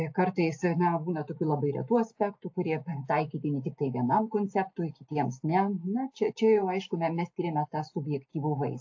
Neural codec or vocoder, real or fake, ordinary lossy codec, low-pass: none; real; AAC, 48 kbps; 7.2 kHz